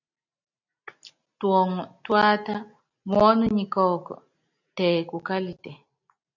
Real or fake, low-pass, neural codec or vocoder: real; 7.2 kHz; none